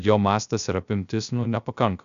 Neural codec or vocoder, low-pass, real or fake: codec, 16 kHz, 0.3 kbps, FocalCodec; 7.2 kHz; fake